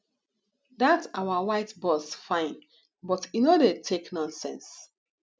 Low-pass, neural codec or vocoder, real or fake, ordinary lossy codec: none; none; real; none